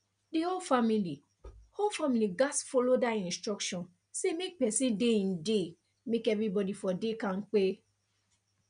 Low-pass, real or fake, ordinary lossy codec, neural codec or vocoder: 9.9 kHz; real; none; none